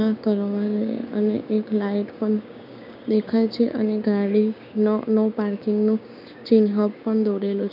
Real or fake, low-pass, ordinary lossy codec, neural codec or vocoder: fake; 5.4 kHz; none; codec, 16 kHz, 6 kbps, DAC